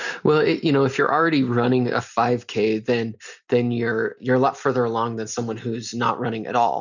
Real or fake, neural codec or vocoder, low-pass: real; none; 7.2 kHz